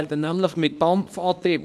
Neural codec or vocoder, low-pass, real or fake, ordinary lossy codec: codec, 24 kHz, 0.9 kbps, WavTokenizer, small release; none; fake; none